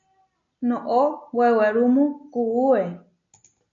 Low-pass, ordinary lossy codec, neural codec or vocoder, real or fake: 7.2 kHz; MP3, 48 kbps; none; real